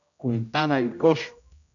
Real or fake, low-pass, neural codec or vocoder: fake; 7.2 kHz; codec, 16 kHz, 0.5 kbps, X-Codec, HuBERT features, trained on general audio